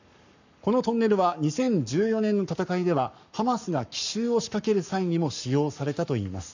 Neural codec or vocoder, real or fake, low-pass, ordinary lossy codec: codec, 44.1 kHz, 7.8 kbps, Pupu-Codec; fake; 7.2 kHz; none